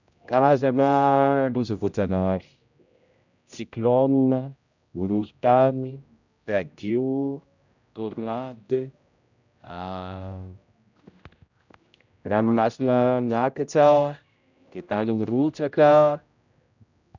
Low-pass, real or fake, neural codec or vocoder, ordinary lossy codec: 7.2 kHz; fake; codec, 16 kHz, 0.5 kbps, X-Codec, HuBERT features, trained on general audio; none